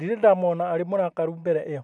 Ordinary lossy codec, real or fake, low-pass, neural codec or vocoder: none; real; none; none